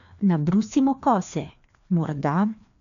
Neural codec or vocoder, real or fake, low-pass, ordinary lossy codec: codec, 16 kHz, 2 kbps, FreqCodec, larger model; fake; 7.2 kHz; none